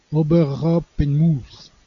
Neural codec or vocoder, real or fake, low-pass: none; real; 7.2 kHz